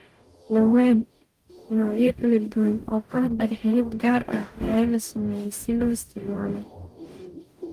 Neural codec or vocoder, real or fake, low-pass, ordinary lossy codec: codec, 44.1 kHz, 0.9 kbps, DAC; fake; 14.4 kHz; Opus, 32 kbps